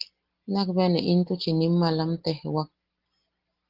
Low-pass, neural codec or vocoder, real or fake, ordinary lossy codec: 5.4 kHz; none; real; Opus, 16 kbps